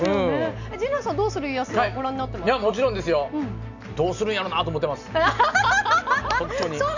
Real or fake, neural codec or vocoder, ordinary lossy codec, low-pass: real; none; none; 7.2 kHz